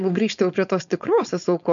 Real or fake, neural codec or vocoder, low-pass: fake; codec, 16 kHz, 4.8 kbps, FACodec; 7.2 kHz